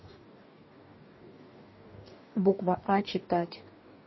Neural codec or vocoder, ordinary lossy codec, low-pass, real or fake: codec, 44.1 kHz, 2.6 kbps, DAC; MP3, 24 kbps; 7.2 kHz; fake